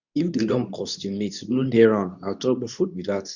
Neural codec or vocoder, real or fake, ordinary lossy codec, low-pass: codec, 24 kHz, 0.9 kbps, WavTokenizer, medium speech release version 2; fake; none; 7.2 kHz